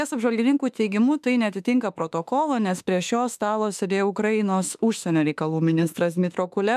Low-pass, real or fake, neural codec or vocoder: 14.4 kHz; fake; autoencoder, 48 kHz, 32 numbers a frame, DAC-VAE, trained on Japanese speech